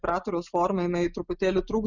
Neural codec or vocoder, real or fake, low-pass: none; real; 7.2 kHz